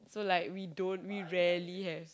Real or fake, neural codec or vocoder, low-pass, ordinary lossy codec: real; none; none; none